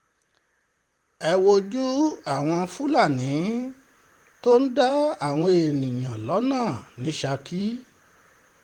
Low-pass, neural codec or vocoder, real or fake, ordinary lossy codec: 19.8 kHz; vocoder, 44.1 kHz, 128 mel bands, Pupu-Vocoder; fake; Opus, 24 kbps